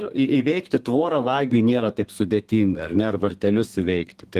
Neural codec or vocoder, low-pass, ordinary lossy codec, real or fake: codec, 32 kHz, 1.9 kbps, SNAC; 14.4 kHz; Opus, 24 kbps; fake